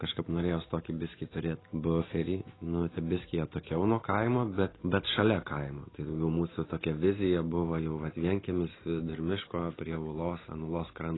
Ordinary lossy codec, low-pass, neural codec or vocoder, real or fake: AAC, 16 kbps; 7.2 kHz; none; real